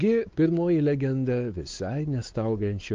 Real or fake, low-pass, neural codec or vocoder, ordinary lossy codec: fake; 7.2 kHz; codec, 16 kHz, 4 kbps, X-Codec, WavLM features, trained on Multilingual LibriSpeech; Opus, 32 kbps